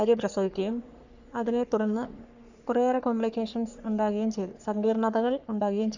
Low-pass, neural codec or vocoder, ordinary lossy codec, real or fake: 7.2 kHz; codec, 44.1 kHz, 3.4 kbps, Pupu-Codec; none; fake